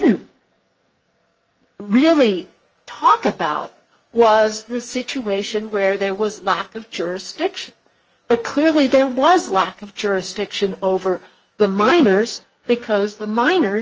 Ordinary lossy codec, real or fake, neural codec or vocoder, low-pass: Opus, 24 kbps; fake; codec, 44.1 kHz, 2.6 kbps, SNAC; 7.2 kHz